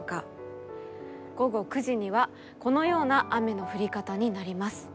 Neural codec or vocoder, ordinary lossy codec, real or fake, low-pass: none; none; real; none